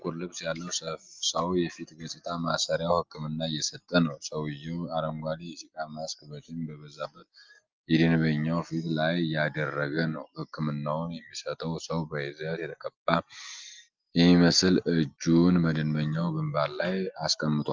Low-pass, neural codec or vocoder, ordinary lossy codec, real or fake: 7.2 kHz; none; Opus, 32 kbps; real